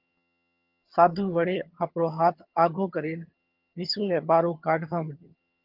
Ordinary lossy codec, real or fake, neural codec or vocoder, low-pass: Opus, 24 kbps; fake; vocoder, 22.05 kHz, 80 mel bands, HiFi-GAN; 5.4 kHz